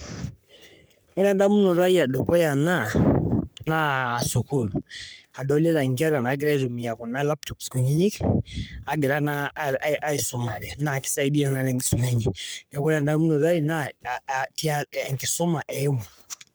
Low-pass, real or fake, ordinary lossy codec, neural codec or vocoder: none; fake; none; codec, 44.1 kHz, 3.4 kbps, Pupu-Codec